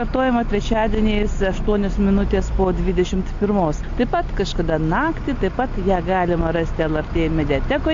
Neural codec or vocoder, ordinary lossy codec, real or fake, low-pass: none; AAC, 48 kbps; real; 7.2 kHz